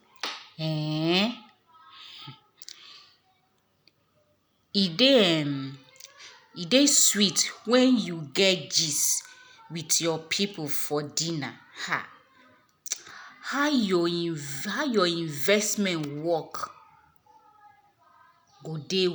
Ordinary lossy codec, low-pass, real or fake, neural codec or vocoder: none; none; real; none